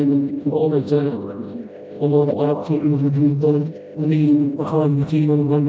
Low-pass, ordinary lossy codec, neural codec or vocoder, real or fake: none; none; codec, 16 kHz, 0.5 kbps, FreqCodec, smaller model; fake